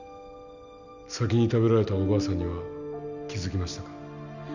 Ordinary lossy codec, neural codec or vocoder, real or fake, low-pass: none; none; real; 7.2 kHz